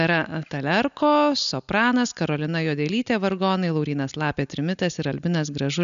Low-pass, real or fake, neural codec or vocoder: 7.2 kHz; real; none